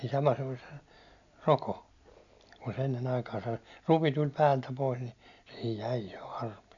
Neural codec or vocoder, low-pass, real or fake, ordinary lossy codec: none; 7.2 kHz; real; none